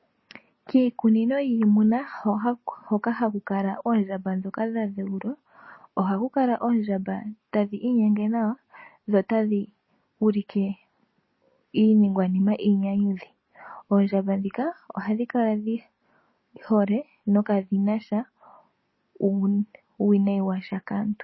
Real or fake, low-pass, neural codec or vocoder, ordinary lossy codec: real; 7.2 kHz; none; MP3, 24 kbps